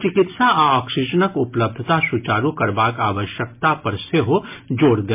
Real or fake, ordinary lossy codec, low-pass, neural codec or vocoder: real; MP3, 32 kbps; 3.6 kHz; none